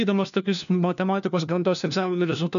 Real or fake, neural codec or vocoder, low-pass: fake; codec, 16 kHz, 1 kbps, FunCodec, trained on LibriTTS, 50 frames a second; 7.2 kHz